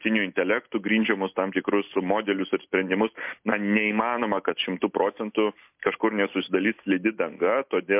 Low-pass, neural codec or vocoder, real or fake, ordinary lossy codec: 3.6 kHz; none; real; MP3, 32 kbps